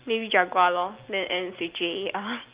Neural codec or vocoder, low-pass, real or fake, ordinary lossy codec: none; 3.6 kHz; real; Opus, 32 kbps